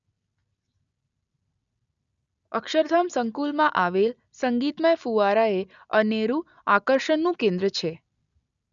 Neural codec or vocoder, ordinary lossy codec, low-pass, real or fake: none; none; 7.2 kHz; real